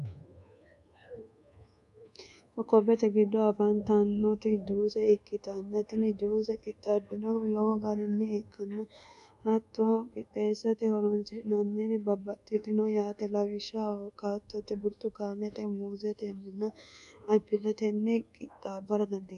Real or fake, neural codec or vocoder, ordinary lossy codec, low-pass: fake; codec, 24 kHz, 1.2 kbps, DualCodec; MP3, 96 kbps; 10.8 kHz